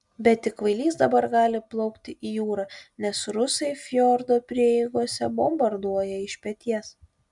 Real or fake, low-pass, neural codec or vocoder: real; 10.8 kHz; none